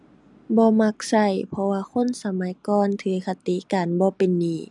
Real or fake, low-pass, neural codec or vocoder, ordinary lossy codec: real; 10.8 kHz; none; none